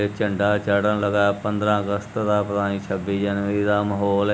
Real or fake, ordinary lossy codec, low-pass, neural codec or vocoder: real; none; none; none